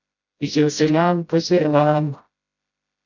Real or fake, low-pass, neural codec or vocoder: fake; 7.2 kHz; codec, 16 kHz, 0.5 kbps, FreqCodec, smaller model